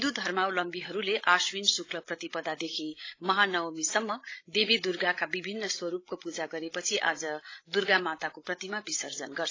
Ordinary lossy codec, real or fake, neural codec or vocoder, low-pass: AAC, 32 kbps; fake; codec, 16 kHz, 16 kbps, FreqCodec, larger model; 7.2 kHz